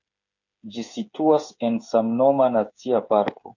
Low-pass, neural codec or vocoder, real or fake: 7.2 kHz; codec, 16 kHz, 8 kbps, FreqCodec, smaller model; fake